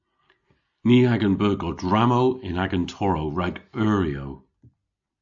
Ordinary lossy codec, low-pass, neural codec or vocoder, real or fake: AAC, 64 kbps; 7.2 kHz; none; real